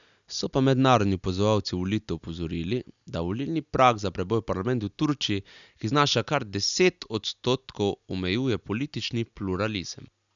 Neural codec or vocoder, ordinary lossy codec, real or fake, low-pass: none; none; real; 7.2 kHz